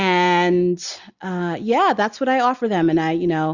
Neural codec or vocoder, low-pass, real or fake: none; 7.2 kHz; real